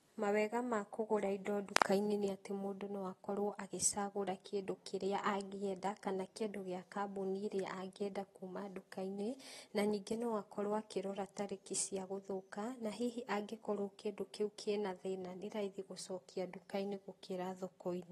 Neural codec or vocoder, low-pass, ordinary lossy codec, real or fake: none; 19.8 kHz; AAC, 32 kbps; real